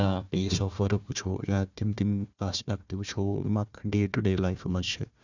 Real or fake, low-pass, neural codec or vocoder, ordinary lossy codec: fake; 7.2 kHz; codec, 16 kHz, 1 kbps, FunCodec, trained on Chinese and English, 50 frames a second; none